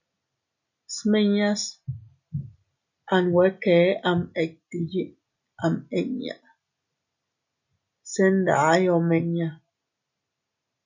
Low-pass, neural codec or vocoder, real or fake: 7.2 kHz; none; real